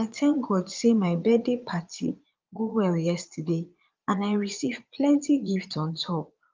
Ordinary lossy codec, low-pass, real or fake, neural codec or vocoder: Opus, 24 kbps; 7.2 kHz; fake; vocoder, 22.05 kHz, 80 mel bands, Vocos